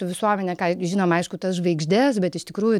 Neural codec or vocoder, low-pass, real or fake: none; 19.8 kHz; real